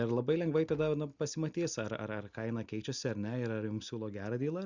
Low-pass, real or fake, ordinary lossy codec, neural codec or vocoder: 7.2 kHz; real; Opus, 64 kbps; none